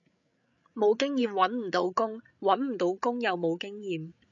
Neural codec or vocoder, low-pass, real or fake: codec, 16 kHz, 8 kbps, FreqCodec, larger model; 7.2 kHz; fake